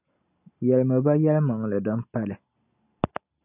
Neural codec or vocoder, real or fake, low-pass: none; real; 3.6 kHz